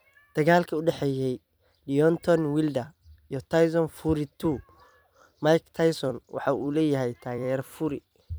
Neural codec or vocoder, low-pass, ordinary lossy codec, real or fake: vocoder, 44.1 kHz, 128 mel bands every 256 samples, BigVGAN v2; none; none; fake